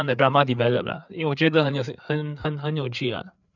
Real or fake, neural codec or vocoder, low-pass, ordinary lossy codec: fake; codec, 16 kHz, 4 kbps, FreqCodec, larger model; 7.2 kHz; none